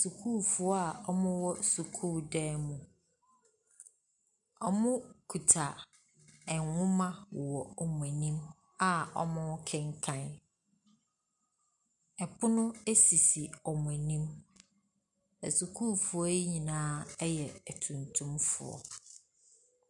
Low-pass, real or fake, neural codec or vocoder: 10.8 kHz; real; none